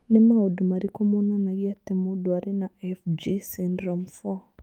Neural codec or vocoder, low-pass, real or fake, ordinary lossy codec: autoencoder, 48 kHz, 128 numbers a frame, DAC-VAE, trained on Japanese speech; 19.8 kHz; fake; Opus, 32 kbps